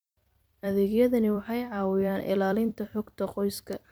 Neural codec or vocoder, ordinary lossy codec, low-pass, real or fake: vocoder, 44.1 kHz, 128 mel bands every 256 samples, BigVGAN v2; none; none; fake